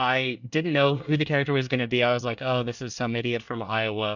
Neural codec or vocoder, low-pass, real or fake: codec, 24 kHz, 1 kbps, SNAC; 7.2 kHz; fake